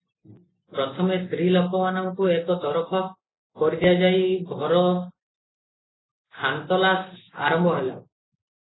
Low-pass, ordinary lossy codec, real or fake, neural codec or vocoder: 7.2 kHz; AAC, 16 kbps; real; none